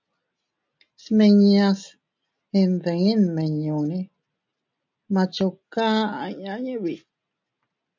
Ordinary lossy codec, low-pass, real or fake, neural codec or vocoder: MP3, 64 kbps; 7.2 kHz; real; none